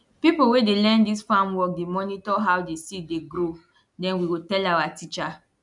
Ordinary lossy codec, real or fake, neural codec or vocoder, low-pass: none; real; none; 10.8 kHz